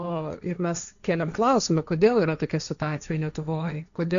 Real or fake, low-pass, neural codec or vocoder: fake; 7.2 kHz; codec, 16 kHz, 1.1 kbps, Voila-Tokenizer